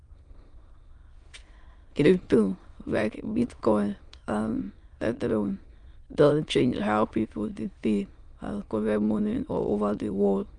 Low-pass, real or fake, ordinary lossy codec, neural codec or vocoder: 9.9 kHz; fake; Opus, 32 kbps; autoencoder, 22.05 kHz, a latent of 192 numbers a frame, VITS, trained on many speakers